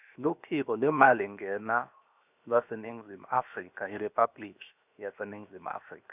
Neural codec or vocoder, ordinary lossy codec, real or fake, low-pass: codec, 16 kHz, 0.7 kbps, FocalCodec; none; fake; 3.6 kHz